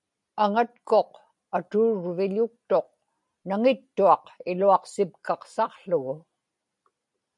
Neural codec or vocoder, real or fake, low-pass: none; real; 10.8 kHz